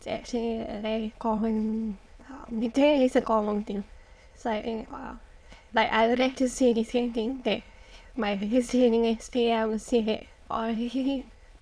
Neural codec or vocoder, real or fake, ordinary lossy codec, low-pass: autoencoder, 22.05 kHz, a latent of 192 numbers a frame, VITS, trained on many speakers; fake; none; none